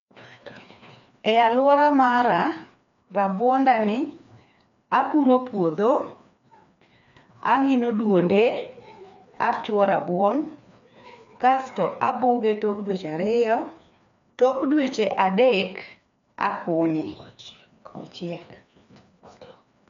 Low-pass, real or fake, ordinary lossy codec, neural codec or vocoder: 7.2 kHz; fake; MP3, 64 kbps; codec, 16 kHz, 2 kbps, FreqCodec, larger model